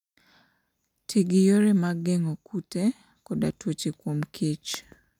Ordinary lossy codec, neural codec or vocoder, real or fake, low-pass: none; none; real; 19.8 kHz